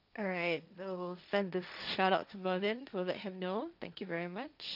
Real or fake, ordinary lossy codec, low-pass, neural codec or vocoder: fake; none; 5.4 kHz; codec, 16 kHz, 1.1 kbps, Voila-Tokenizer